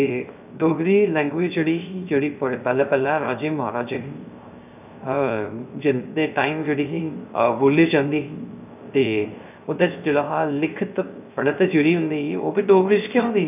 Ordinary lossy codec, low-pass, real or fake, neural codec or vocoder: none; 3.6 kHz; fake; codec, 16 kHz, 0.3 kbps, FocalCodec